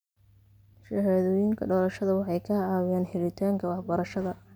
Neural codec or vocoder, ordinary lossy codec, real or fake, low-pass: none; none; real; none